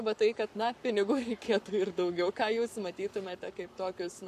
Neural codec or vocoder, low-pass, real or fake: codec, 44.1 kHz, 7.8 kbps, Pupu-Codec; 14.4 kHz; fake